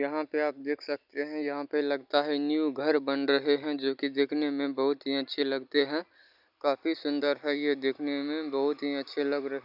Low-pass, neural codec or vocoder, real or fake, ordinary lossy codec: 5.4 kHz; none; real; none